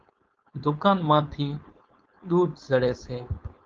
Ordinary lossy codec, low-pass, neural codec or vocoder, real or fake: Opus, 24 kbps; 7.2 kHz; codec, 16 kHz, 4.8 kbps, FACodec; fake